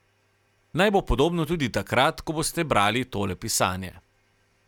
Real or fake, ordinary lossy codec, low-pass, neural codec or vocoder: real; none; 19.8 kHz; none